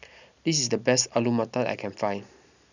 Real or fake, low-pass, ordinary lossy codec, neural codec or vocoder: real; 7.2 kHz; none; none